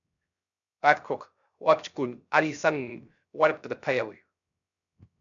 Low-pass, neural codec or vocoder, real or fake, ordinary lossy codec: 7.2 kHz; codec, 16 kHz, 0.3 kbps, FocalCodec; fake; MP3, 96 kbps